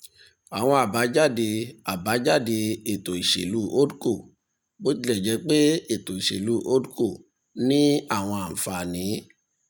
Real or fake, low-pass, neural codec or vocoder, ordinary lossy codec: real; none; none; none